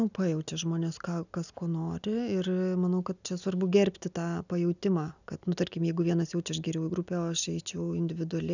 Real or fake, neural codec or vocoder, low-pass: real; none; 7.2 kHz